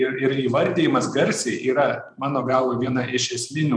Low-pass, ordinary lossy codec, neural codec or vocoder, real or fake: 9.9 kHz; MP3, 96 kbps; vocoder, 44.1 kHz, 128 mel bands every 512 samples, BigVGAN v2; fake